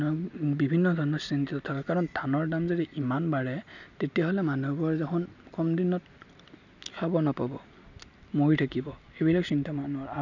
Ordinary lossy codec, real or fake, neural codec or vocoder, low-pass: none; real; none; 7.2 kHz